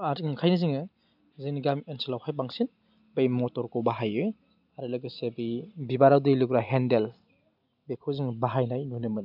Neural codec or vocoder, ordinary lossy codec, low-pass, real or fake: none; none; 5.4 kHz; real